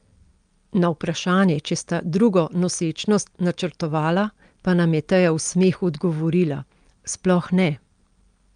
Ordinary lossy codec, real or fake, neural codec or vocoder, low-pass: Opus, 32 kbps; real; none; 9.9 kHz